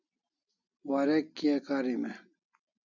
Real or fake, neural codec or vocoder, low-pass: real; none; 7.2 kHz